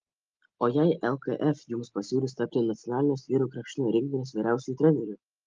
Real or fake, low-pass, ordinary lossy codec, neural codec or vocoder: real; 7.2 kHz; Opus, 24 kbps; none